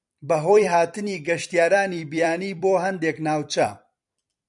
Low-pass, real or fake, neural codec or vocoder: 10.8 kHz; fake; vocoder, 44.1 kHz, 128 mel bands every 256 samples, BigVGAN v2